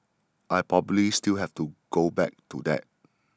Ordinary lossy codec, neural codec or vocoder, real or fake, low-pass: none; none; real; none